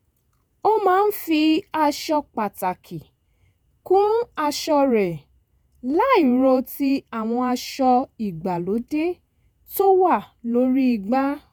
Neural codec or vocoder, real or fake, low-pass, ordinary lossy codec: vocoder, 48 kHz, 128 mel bands, Vocos; fake; none; none